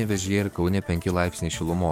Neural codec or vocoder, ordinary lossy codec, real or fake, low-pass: codec, 44.1 kHz, 7.8 kbps, DAC; MP3, 96 kbps; fake; 19.8 kHz